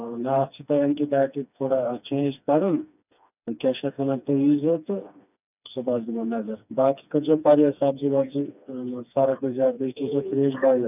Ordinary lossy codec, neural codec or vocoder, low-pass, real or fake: none; codec, 16 kHz, 2 kbps, FreqCodec, smaller model; 3.6 kHz; fake